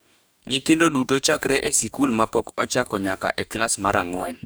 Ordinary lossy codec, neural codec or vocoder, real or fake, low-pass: none; codec, 44.1 kHz, 2.6 kbps, DAC; fake; none